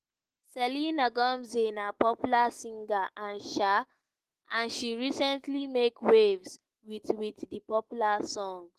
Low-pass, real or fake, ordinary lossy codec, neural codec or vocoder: 14.4 kHz; fake; Opus, 16 kbps; autoencoder, 48 kHz, 128 numbers a frame, DAC-VAE, trained on Japanese speech